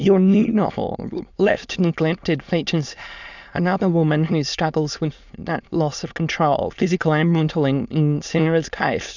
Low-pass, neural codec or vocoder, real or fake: 7.2 kHz; autoencoder, 22.05 kHz, a latent of 192 numbers a frame, VITS, trained on many speakers; fake